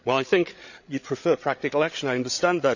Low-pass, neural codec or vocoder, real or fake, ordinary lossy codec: 7.2 kHz; codec, 16 kHz, 8 kbps, FreqCodec, larger model; fake; none